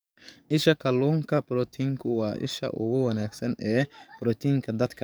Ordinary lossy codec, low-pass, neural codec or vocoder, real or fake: none; none; codec, 44.1 kHz, 7.8 kbps, DAC; fake